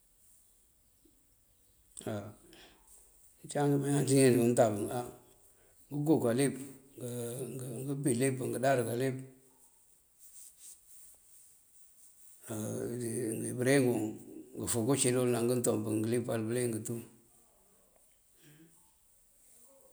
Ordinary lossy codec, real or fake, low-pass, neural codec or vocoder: none; real; none; none